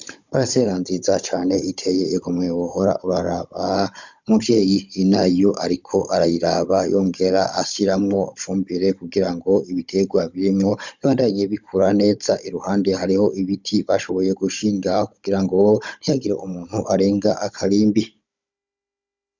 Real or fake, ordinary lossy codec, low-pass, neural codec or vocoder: fake; Opus, 64 kbps; 7.2 kHz; codec, 16 kHz, 16 kbps, FunCodec, trained on Chinese and English, 50 frames a second